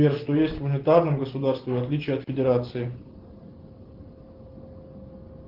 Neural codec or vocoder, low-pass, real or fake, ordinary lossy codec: none; 5.4 kHz; real; Opus, 16 kbps